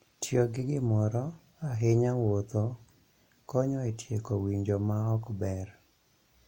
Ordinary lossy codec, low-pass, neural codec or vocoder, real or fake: MP3, 64 kbps; 19.8 kHz; none; real